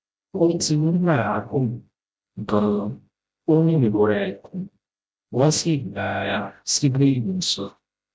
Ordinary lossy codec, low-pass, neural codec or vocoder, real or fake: none; none; codec, 16 kHz, 0.5 kbps, FreqCodec, smaller model; fake